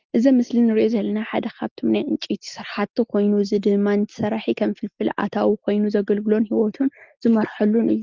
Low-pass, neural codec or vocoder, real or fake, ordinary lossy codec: 7.2 kHz; none; real; Opus, 24 kbps